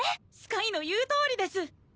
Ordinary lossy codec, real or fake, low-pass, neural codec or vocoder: none; real; none; none